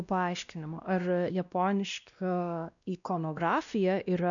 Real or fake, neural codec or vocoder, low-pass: fake; codec, 16 kHz, 1 kbps, X-Codec, WavLM features, trained on Multilingual LibriSpeech; 7.2 kHz